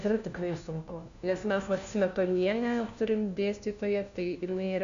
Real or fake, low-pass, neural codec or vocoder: fake; 7.2 kHz; codec, 16 kHz, 1 kbps, FunCodec, trained on LibriTTS, 50 frames a second